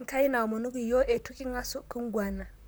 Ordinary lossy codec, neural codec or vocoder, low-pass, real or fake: none; vocoder, 44.1 kHz, 128 mel bands, Pupu-Vocoder; none; fake